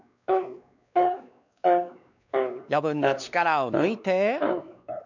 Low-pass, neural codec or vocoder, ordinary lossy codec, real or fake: 7.2 kHz; codec, 16 kHz, 2 kbps, X-Codec, WavLM features, trained on Multilingual LibriSpeech; none; fake